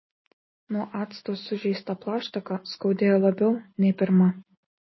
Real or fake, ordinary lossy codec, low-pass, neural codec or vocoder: real; MP3, 24 kbps; 7.2 kHz; none